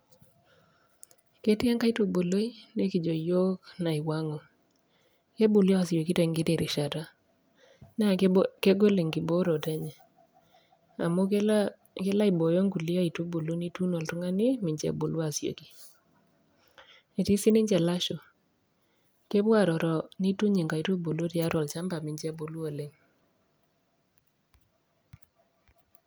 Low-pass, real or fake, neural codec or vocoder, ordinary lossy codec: none; real; none; none